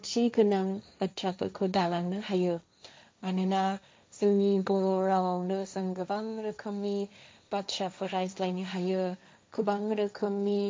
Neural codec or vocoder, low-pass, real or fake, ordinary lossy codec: codec, 16 kHz, 1.1 kbps, Voila-Tokenizer; none; fake; none